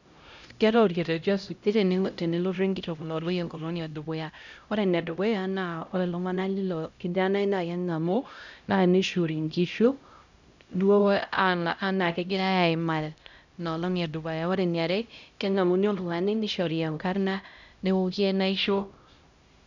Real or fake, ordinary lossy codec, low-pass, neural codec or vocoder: fake; none; 7.2 kHz; codec, 16 kHz, 0.5 kbps, X-Codec, HuBERT features, trained on LibriSpeech